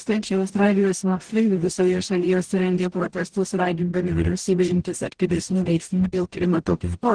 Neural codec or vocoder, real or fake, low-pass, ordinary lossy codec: codec, 44.1 kHz, 0.9 kbps, DAC; fake; 9.9 kHz; Opus, 16 kbps